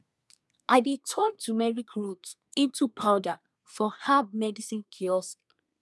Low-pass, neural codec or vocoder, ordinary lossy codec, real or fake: none; codec, 24 kHz, 1 kbps, SNAC; none; fake